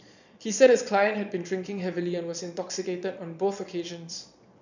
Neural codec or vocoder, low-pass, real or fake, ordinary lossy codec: vocoder, 22.05 kHz, 80 mel bands, Vocos; 7.2 kHz; fake; none